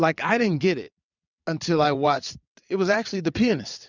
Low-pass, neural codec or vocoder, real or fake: 7.2 kHz; vocoder, 22.05 kHz, 80 mel bands, WaveNeXt; fake